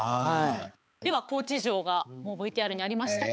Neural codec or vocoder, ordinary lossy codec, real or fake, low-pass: codec, 16 kHz, 4 kbps, X-Codec, HuBERT features, trained on balanced general audio; none; fake; none